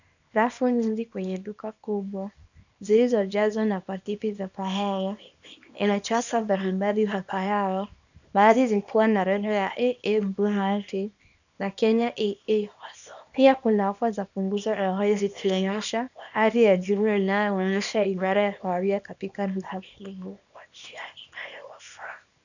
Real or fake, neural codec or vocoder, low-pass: fake; codec, 24 kHz, 0.9 kbps, WavTokenizer, small release; 7.2 kHz